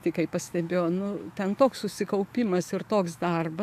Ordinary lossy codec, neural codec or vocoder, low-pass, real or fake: AAC, 96 kbps; autoencoder, 48 kHz, 128 numbers a frame, DAC-VAE, trained on Japanese speech; 14.4 kHz; fake